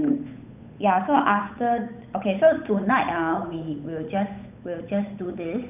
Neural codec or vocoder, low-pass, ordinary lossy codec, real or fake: codec, 16 kHz, 8 kbps, FunCodec, trained on Chinese and English, 25 frames a second; 3.6 kHz; none; fake